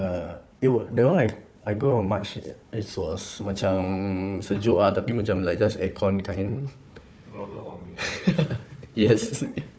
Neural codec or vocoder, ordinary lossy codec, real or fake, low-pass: codec, 16 kHz, 4 kbps, FunCodec, trained on Chinese and English, 50 frames a second; none; fake; none